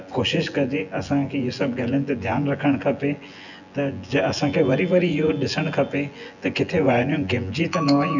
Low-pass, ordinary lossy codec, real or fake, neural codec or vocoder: 7.2 kHz; none; fake; vocoder, 24 kHz, 100 mel bands, Vocos